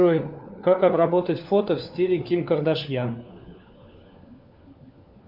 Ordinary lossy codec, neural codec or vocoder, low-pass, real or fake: AAC, 48 kbps; codec, 16 kHz, 4 kbps, FunCodec, trained on LibriTTS, 50 frames a second; 5.4 kHz; fake